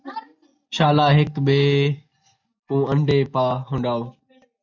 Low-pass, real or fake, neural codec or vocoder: 7.2 kHz; real; none